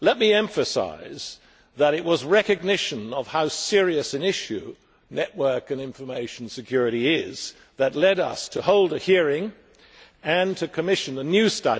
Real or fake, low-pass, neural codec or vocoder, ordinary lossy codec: real; none; none; none